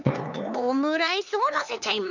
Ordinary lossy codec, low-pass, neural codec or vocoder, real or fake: none; 7.2 kHz; codec, 16 kHz, 4 kbps, X-Codec, HuBERT features, trained on LibriSpeech; fake